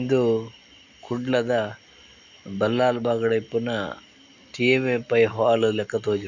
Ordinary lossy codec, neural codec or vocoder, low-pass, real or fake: none; none; 7.2 kHz; real